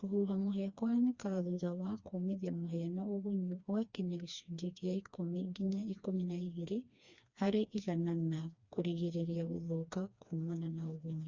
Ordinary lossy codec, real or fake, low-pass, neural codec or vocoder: Opus, 64 kbps; fake; 7.2 kHz; codec, 16 kHz, 2 kbps, FreqCodec, smaller model